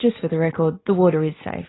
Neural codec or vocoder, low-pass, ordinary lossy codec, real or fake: none; 7.2 kHz; AAC, 16 kbps; real